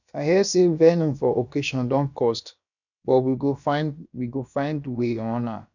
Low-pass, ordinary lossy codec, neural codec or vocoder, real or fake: 7.2 kHz; none; codec, 16 kHz, about 1 kbps, DyCAST, with the encoder's durations; fake